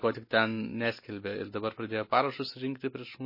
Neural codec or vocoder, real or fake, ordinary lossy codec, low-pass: none; real; MP3, 24 kbps; 5.4 kHz